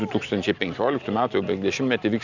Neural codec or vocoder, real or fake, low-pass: none; real; 7.2 kHz